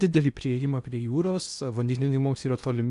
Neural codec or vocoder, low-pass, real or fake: codec, 16 kHz in and 24 kHz out, 0.8 kbps, FocalCodec, streaming, 65536 codes; 10.8 kHz; fake